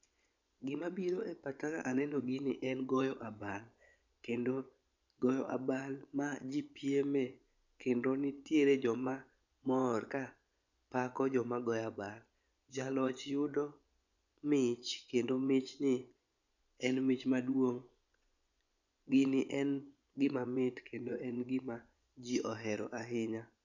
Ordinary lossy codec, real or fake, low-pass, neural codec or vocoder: none; fake; 7.2 kHz; vocoder, 24 kHz, 100 mel bands, Vocos